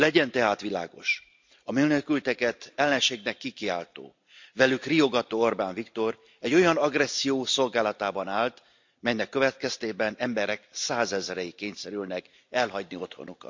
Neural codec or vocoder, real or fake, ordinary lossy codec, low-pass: none; real; MP3, 64 kbps; 7.2 kHz